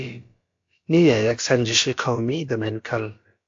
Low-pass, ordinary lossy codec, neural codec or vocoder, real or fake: 7.2 kHz; AAC, 48 kbps; codec, 16 kHz, about 1 kbps, DyCAST, with the encoder's durations; fake